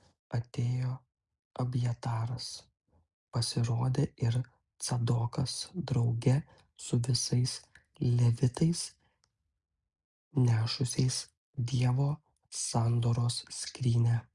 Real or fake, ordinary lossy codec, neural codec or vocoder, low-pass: real; Opus, 64 kbps; none; 10.8 kHz